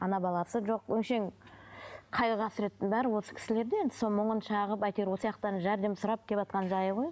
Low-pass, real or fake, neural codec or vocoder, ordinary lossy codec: none; real; none; none